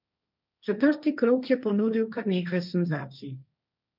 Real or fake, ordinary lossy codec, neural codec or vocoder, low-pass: fake; none; codec, 16 kHz, 1.1 kbps, Voila-Tokenizer; 5.4 kHz